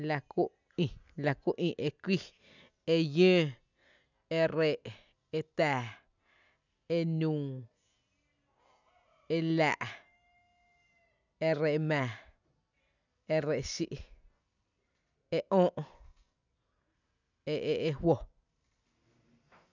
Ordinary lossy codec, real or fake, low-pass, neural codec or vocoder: none; real; 7.2 kHz; none